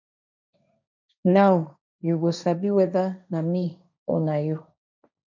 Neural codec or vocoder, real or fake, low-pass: codec, 16 kHz, 1.1 kbps, Voila-Tokenizer; fake; 7.2 kHz